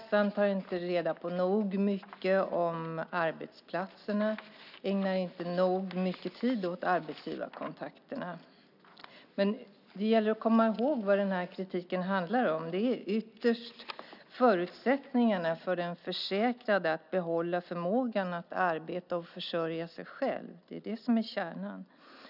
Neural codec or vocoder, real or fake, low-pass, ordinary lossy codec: none; real; 5.4 kHz; none